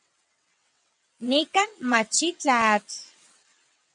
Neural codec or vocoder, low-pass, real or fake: vocoder, 22.05 kHz, 80 mel bands, WaveNeXt; 9.9 kHz; fake